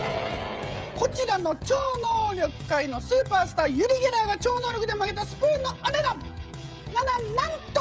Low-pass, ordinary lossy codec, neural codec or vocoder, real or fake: none; none; codec, 16 kHz, 16 kbps, FreqCodec, smaller model; fake